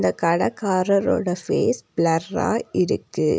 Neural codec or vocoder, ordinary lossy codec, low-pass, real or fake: none; none; none; real